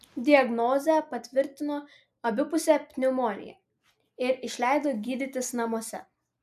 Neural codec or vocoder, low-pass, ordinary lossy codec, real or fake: none; 14.4 kHz; AAC, 96 kbps; real